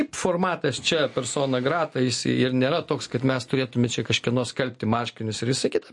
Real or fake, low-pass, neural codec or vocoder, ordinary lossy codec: real; 10.8 kHz; none; MP3, 48 kbps